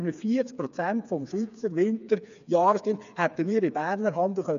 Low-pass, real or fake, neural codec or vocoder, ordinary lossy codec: 7.2 kHz; fake; codec, 16 kHz, 4 kbps, FreqCodec, smaller model; none